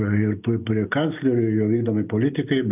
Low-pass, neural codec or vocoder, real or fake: 3.6 kHz; none; real